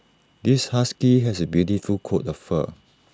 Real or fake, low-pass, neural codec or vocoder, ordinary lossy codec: real; none; none; none